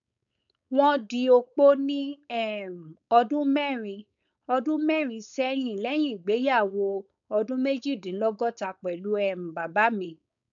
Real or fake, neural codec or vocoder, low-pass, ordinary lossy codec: fake; codec, 16 kHz, 4.8 kbps, FACodec; 7.2 kHz; none